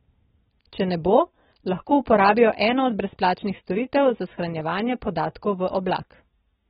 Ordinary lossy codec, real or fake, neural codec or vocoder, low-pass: AAC, 16 kbps; real; none; 19.8 kHz